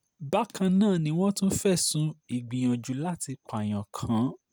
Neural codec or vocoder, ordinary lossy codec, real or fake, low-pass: none; none; real; none